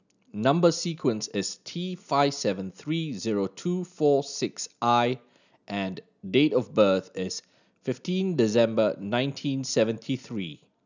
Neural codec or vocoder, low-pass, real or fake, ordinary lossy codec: none; 7.2 kHz; real; none